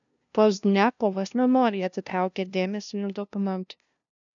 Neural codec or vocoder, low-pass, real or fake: codec, 16 kHz, 0.5 kbps, FunCodec, trained on LibriTTS, 25 frames a second; 7.2 kHz; fake